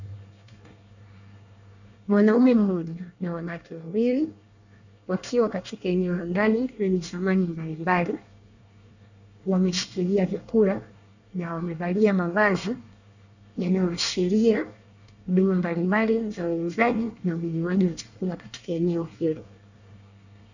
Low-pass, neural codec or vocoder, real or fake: 7.2 kHz; codec, 24 kHz, 1 kbps, SNAC; fake